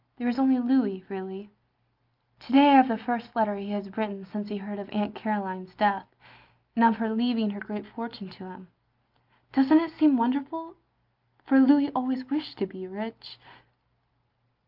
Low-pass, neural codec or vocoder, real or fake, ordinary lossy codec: 5.4 kHz; none; real; Opus, 24 kbps